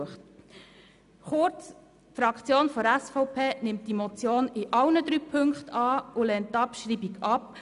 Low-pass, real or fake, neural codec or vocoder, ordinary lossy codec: 10.8 kHz; real; none; none